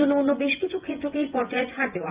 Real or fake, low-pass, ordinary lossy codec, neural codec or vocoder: fake; 3.6 kHz; Opus, 32 kbps; vocoder, 24 kHz, 100 mel bands, Vocos